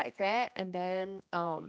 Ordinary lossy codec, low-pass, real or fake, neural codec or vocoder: none; none; fake; codec, 16 kHz, 1 kbps, X-Codec, HuBERT features, trained on general audio